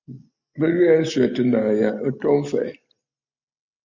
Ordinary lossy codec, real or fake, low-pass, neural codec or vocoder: MP3, 48 kbps; real; 7.2 kHz; none